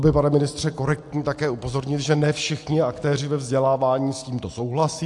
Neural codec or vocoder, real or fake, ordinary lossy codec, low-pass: none; real; AAC, 64 kbps; 10.8 kHz